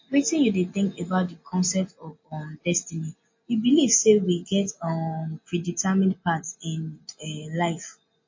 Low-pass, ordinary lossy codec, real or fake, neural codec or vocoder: 7.2 kHz; MP3, 32 kbps; real; none